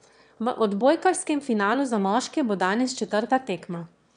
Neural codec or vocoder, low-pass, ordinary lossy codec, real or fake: autoencoder, 22.05 kHz, a latent of 192 numbers a frame, VITS, trained on one speaker; 9.9 kHz; none; fake